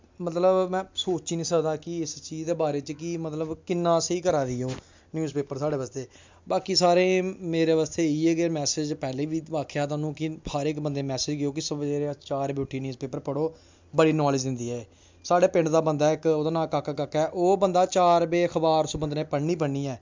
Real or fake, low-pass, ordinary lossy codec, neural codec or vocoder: real; 7.2 kHz; MP3, 64 kbps; none